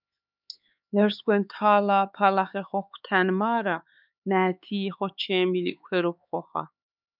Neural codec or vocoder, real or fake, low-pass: codec, 16 kHz, 4 kbps, X-Codec, HuBERT features, trained on LibriSpeech; fake; 5.4 kHz